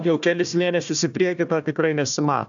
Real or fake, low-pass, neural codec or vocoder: fake; 7.2 kHz; codec, 16 kHz, 1 kbps, FunCodec, trained on Chinese and English, 50 frames a second